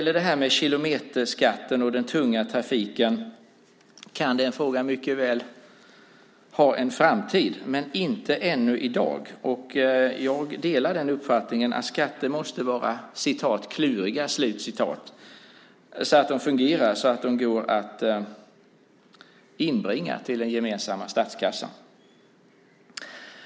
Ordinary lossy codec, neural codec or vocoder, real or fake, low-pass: none; none; real; none